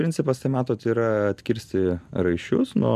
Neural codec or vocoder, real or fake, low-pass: none; real; 14.4 kHz